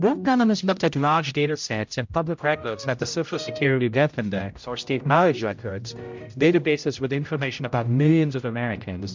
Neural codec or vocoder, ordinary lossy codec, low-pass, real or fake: codec, 16 kHz, 0.5 kbps, X-Codec, HuBERT features, trained on general audio; MP3, 64 kbps; 7.2 kHz; fake